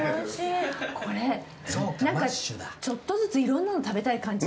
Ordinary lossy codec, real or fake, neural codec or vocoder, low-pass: none; real; none; none